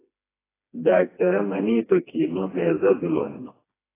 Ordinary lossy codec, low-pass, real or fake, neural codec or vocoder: AAC, 16 kbps; 3.6 kHz; fake; codec, 16 kHz, 2 kbps, FreqCodec, smaller model